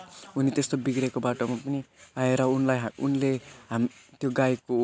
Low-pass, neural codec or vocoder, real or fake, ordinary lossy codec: none; none; real; none